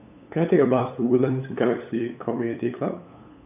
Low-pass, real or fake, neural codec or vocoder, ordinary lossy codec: 3.6 kHz; fake; codec, 16 kHz, 8 kbps, FunCodec, trained on LibriTTS, 25 frames a second; none